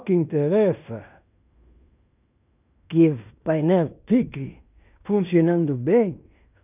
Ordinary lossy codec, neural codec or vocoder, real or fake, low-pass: none; codec, 16 kHz in and 24 kHz out, 0.9 kbps, LongCat-Audio-Codec, fine tuned four codebook decoder; fake; 3.6 kHz